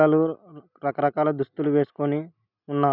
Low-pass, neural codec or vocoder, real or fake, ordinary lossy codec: 5.4 kHz; none; real; none